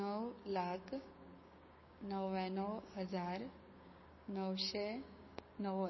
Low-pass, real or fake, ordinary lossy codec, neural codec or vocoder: 7.2 kHz; fake; MP3, 24 kbps; codec, 16 kHz, 6 kbps, DAC